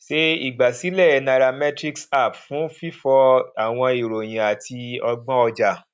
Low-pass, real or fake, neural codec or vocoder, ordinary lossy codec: none; real; none; none